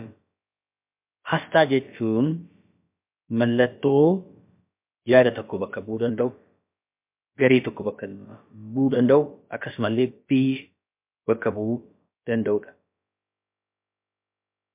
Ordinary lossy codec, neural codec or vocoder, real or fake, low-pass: MP3, 32 kbps; codec, 16 kHz, about 1 kbps, DyCAST, with the encoder's durations; fake; 3.6 kHz